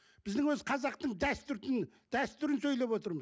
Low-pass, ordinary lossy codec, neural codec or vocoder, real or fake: none; none; none; real